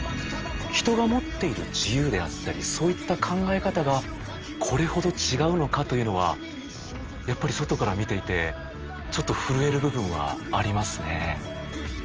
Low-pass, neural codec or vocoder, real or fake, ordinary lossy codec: 7.2 kHz; none; real; Opus, 24 kbps